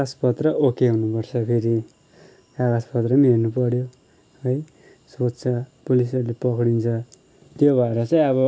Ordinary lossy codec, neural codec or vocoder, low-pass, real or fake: none; none; none; real